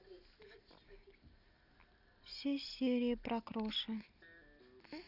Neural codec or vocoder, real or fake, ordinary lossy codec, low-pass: none; real; none; 5.4 kHz